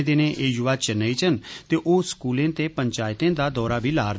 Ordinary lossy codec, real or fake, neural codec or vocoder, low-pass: none; real; none; none